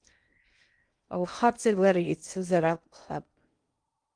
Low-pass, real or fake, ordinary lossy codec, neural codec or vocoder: 9.9 kHz; fake; Opus, 32 kbps; codec, 16 kHz in and 24 kHz out, 0.6 kbps, FocalCodec, streaming, 2048 codes